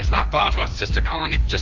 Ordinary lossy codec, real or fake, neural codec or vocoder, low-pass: Opus, 24 kbps; fake; codec, 16 kHz, 2 kbps, FreqCodec, larger model; 7.2 kHz